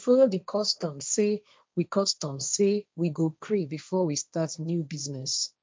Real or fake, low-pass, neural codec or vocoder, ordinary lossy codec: fake; none; codec, 16 kHz, 1.1 kbps, Voila-Tokenizer; none